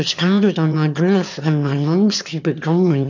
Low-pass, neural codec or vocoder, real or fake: 7.2 kHz; autoencoder, 22.05 kHz, a latent of 192 numbers a frame, VITS, trained on one speaker; fake